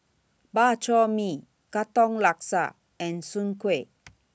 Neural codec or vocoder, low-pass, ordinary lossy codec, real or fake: none; none; none; real